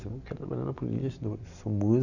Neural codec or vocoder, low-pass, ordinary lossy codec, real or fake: none; 7.2 kHz; none; real